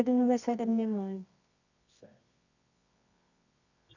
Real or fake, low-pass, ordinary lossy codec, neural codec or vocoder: fake; 7.2 kHz; none; codec, 24 kHz, 0.9 kbps, WavTokenizer, medium music audio release